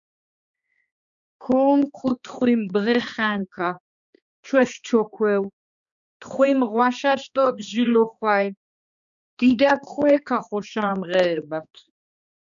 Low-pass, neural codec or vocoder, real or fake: 7.2 kHz; codec, 16 kHz, 2 kbps, X-Codec, HuBERT features, trained on balanced general audio; fake